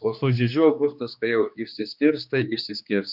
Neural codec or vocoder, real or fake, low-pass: codec, 16 kHz, 2 kbps, X-Codec, HuBERT features, trained on general audio; fake; 5.4 kHz